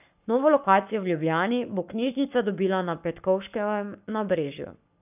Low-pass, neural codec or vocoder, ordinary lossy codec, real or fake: 3.6 kHz; codec, 44.1 kHz, 7.8 kbps, Pupu-Codec; none; fake